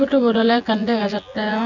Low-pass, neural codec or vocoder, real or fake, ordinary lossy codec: 7.2 kHz; vocoder, 24 kHz, 100 mel bands, Vocos; fake; none